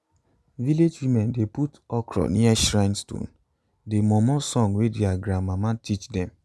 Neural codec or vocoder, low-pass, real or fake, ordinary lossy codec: none; none; real; none